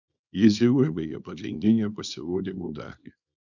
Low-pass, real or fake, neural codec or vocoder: 7.2 kHz; fake; codec, 24 kHz, 0.9 kbps, WavTokenizer, small release